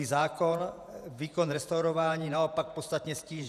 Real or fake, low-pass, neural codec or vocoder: fake; 14.4 kHz; vocoder, 48 kHz, 128 mel bands, Vocos